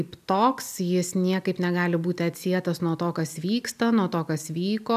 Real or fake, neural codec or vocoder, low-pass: real; none; 14.4 kHz